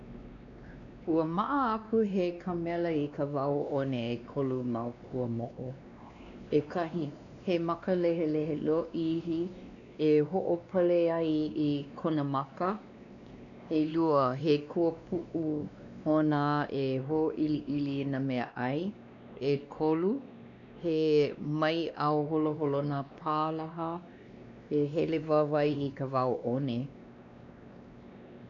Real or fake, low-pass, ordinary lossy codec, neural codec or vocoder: fake; 7.2 kHz; none; codec, 16 kHz, 2 kbps, X-Codec, WavLM features, trained on Multilingual LibriSpeech